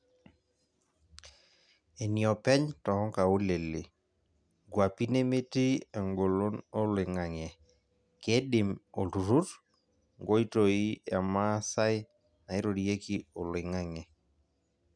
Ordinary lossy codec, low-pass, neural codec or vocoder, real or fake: none; 9.9 kHz; none; real